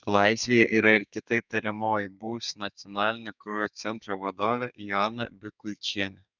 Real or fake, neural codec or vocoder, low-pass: fake; codec, 32 kHz, 1.9 kbps, SNAC; 7.2 kHz